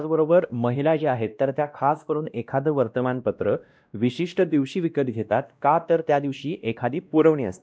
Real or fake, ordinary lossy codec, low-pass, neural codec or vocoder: fake; none; none; codec, 16 kHz, 1 kbps, X-Codec, WavLM features, trained on Multilingual LibriSpeech